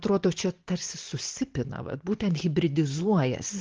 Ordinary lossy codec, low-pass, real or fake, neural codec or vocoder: Opus, 16 kbps; 7.2 kHz; real; none